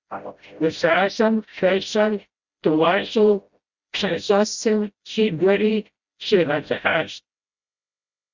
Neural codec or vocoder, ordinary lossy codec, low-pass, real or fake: codec, 16 kHz, 0.5 kbps, FreqCodec, smaller model; Opus, 64 kbps; 7.2 kHz; fake